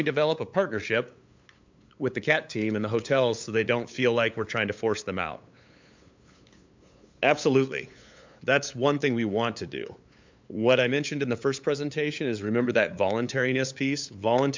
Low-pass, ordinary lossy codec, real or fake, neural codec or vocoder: 7.2 kHz; MP3, 64 kbps; fake; codec, 16 kHz, 8 kbps, FunCodec, trained on LibriTTS, 25 frames a second